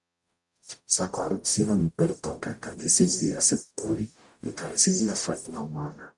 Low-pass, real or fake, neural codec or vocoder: 10.8 kHz; fake; codec, 44.1 kHz, 0.9 kbps, DAC